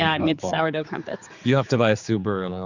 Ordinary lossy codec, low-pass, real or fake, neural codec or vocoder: Opus, 64 kbps; 7.2 kHz; fake; codec, 16 kHz, 4 kbps, X-Codec, HuBERT features, trained on general audio